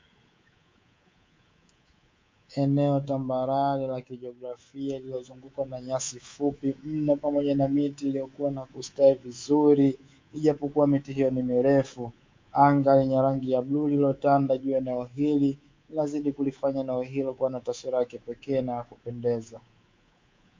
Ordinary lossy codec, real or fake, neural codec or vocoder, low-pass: MP3, 48 kbps; fake; codec, 24 kHz, 3.1 kbps, DualCodec; 7.2 kHz